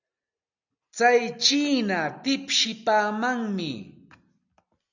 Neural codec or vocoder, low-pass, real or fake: none; 7.2 kHz; real